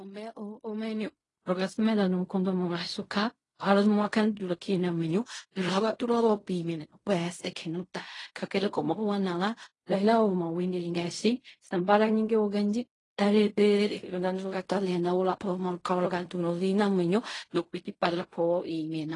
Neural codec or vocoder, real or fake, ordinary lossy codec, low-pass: codec, 16 kHz in and 24 kHz out, 0.4 kbps, LongCat-Audio-Codec, fine tuned four codebook decoder; fake; AAC, 32 kbps; 10.8 kHz